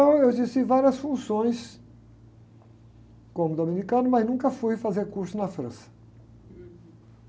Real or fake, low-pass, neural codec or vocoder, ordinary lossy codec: real; none; none; none